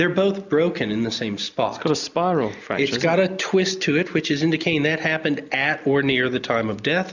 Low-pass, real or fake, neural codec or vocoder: 7.2 kHz; real; none